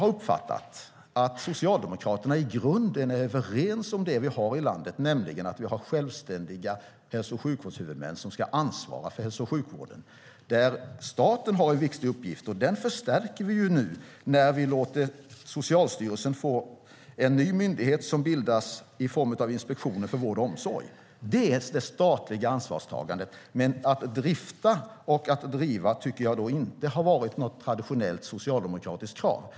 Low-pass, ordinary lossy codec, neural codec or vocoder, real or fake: none; none; none; real